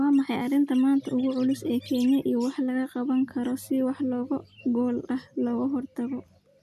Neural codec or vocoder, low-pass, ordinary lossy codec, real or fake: none; 14.4 kHz; none; real